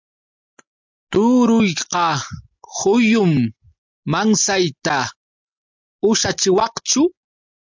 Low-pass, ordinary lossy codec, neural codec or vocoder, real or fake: 7.2 kHz; MP3, 64 kbps; none; real